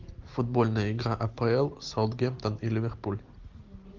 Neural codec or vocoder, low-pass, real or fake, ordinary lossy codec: none; 7.2 kHz; real; Opus, 24 kbps